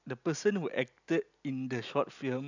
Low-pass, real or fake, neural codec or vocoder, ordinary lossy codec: 7.2 kHz; real; none; none